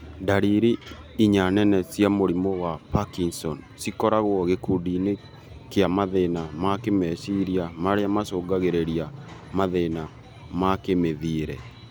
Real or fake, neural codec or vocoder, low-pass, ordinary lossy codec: real; none; none; none